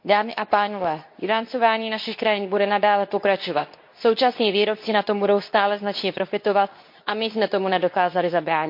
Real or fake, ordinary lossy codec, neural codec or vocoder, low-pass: fake; MP3, 32 kbps; codec, 24 kHz, 0.9 kbps, WavTokenizer, medium speech release version 2; 5.4 kHz